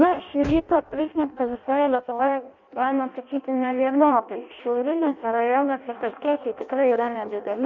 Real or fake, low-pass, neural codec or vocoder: fake; 7.2 kHz; codec, 16 kHz in and 24 kHz out, 0.6 kbps, FireRedTTS-2 codec